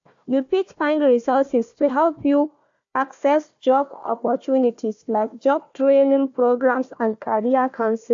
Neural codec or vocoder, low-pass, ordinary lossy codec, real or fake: codec, 16 kHz, 1 kbps, FunCodec, trained on Chinese and English, 50 frames a second; 7.2 kHz; AAC, 64 kbps; fake